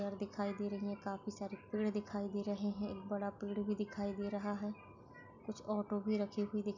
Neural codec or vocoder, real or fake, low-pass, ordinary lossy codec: none; real; 7.2 kHz; none